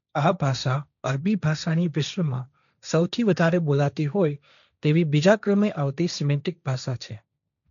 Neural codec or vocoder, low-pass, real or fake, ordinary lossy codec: codec, 16 kHz, 1.1 kbps, Voila-Tokenizer; 7.2 kHz; fake; none